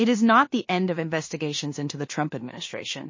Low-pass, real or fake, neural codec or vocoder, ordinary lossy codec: 7.2 kHz; fake; codec, 16 kHz in and 24 kHz out, 0.4 kbps, LongCat-Audio-Codec, two codebook decoder; MP3, 32 kbps